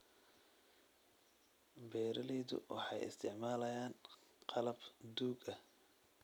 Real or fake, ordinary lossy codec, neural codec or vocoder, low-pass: real; none; none; none